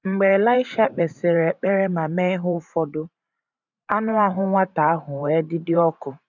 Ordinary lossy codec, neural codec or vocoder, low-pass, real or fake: none; vocoder, 44.1 kHz, 128 mel bands every 512 samples, BigVGAN v2; 7.2 kHz; fake